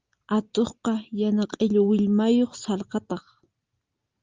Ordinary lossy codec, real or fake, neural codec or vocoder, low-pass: Opus, 24 kbps; real; none; 7.2 kHz